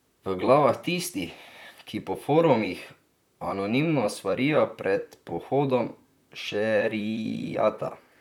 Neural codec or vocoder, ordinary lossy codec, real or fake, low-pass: vocoder, 44.1 kHz, 128 mel bands, Pupu-Vocoder; none; fake; 19.8 kHz